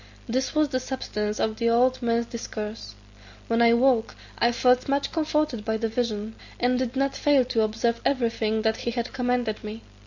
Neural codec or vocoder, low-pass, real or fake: none; 7.2 kHz; real